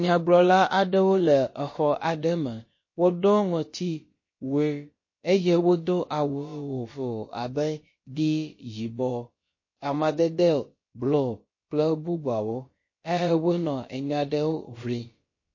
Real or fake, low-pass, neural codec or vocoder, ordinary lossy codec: fake; 7.2 kHz; codec, 16 kHz, about 1 kbps, DyCAST, with the encoder's durations; MP3, 32 kbps